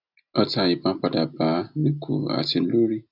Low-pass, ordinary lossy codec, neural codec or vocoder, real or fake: 5.4 kHz; none; none; real